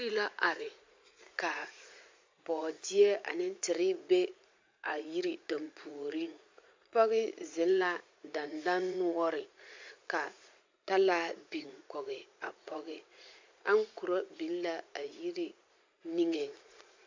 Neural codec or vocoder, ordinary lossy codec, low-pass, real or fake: vocoder, 44.1 kHz, 80 mel bands, Vocos; MP3, 48 kbps; 7.2 kHz; fake